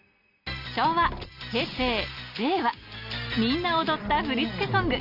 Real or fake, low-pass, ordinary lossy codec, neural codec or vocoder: real; 5.4 kHz; none; none